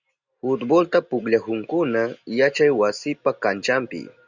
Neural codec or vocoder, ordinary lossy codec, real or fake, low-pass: none; Opus, 64 kbps; real; 7.2 kHz